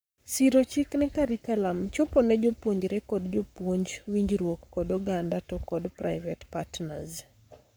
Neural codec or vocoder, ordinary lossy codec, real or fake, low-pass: codec, 44.1 kHz, 7.8 kbps, Pupu-Codec; none; fake; none